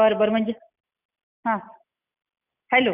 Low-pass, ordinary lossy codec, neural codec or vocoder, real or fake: 3.6 kHz; none; none; real